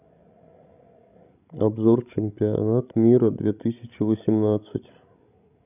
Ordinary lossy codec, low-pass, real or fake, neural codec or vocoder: none; 3.6 kHz; fake; codec, 16 kHz, 16 kbps, FreqCodec, larger model